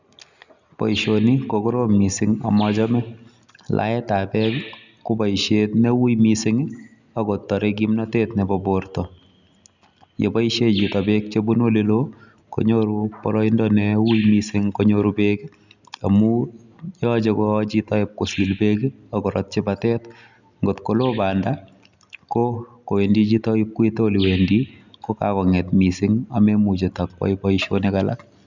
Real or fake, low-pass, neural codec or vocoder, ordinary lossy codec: real; 7.2 kHz; none; none